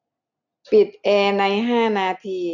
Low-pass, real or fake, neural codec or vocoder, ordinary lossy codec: 7.2 kHz; real; none; none